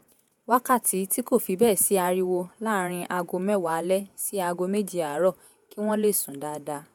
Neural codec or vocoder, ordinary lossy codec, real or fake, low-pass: vocoder, 48 kHz, 128 mel bands, Vocos; none; fake; none